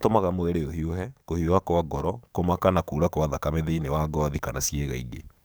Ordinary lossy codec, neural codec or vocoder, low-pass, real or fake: none; codec, 44.1 kHz, 7.8 kbps, DAC; none; fake